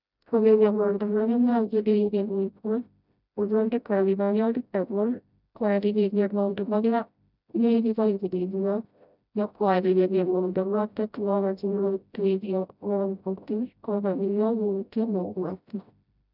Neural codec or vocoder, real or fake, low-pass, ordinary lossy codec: codec, 16 kHz, 0.5 kbps, FreqCodec, smaller model; fake; 5.4 kHz; none